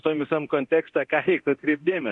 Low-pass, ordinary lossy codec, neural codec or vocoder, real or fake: 10.8 kHz; MP3, 64 kbps; codec, 24 kHz, 0.9 kbps, DualCodec; fake